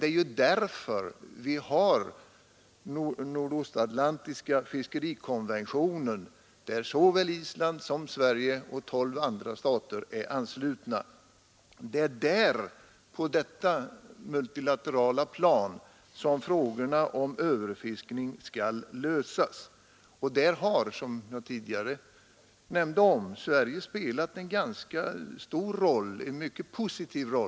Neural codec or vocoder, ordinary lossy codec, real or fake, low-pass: none; none; real; none